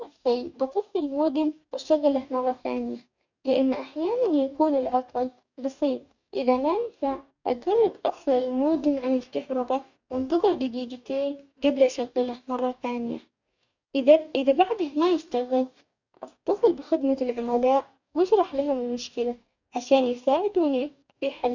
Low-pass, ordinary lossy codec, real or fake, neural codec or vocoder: 7.2 kHz; none; fake; codec, 44.1 kHz, 2.6 kbps, DAC